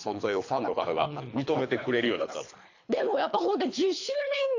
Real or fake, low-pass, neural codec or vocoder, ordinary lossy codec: fake; 7.2 kHz; codec, 24 kHz, 3 kbps, HILCodec; AAC, 48 kbps